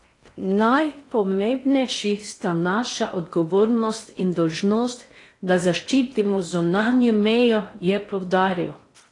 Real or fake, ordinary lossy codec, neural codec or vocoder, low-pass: fake; AAC, 48 kbps; codec, 16 kHz in and 24 kHz out, 0.6 kbps, FocalCodec, streaming, 4096 codes; 10.8 kHz